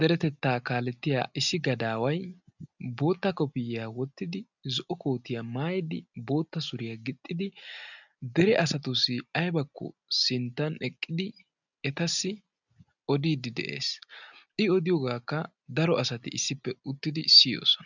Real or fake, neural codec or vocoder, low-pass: real; none; 7.2 kHz